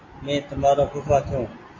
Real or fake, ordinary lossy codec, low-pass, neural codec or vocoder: real; AAC, 32 kbps; 7.2 kHz; none